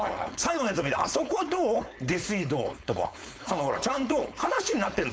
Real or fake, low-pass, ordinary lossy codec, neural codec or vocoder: fake; none; none; codec, 16 kHz, 4.8 kbps, FACodec